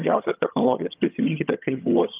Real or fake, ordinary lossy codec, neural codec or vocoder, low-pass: fake; Opus, 24 kbps; vocoder, 22.05 kHz, 80 mel bands, HiFi-GAN; 3.6 kHz